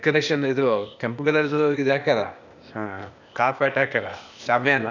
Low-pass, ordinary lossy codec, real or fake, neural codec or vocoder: 7.2 kHz; none; fake; codec, 16 kHz, 0.8 kbps, ZipCodec